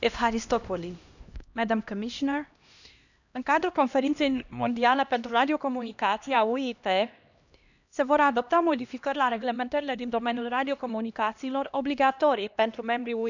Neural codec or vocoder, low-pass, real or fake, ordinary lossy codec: codec, 16 kHz, 1 kbps, X-Codec, HuBERT features, trained on LibriSpeech; 7.2 kHz; fake; none